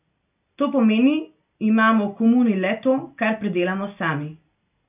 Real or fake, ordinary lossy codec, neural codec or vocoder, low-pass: real; none; none; 3.6 kHz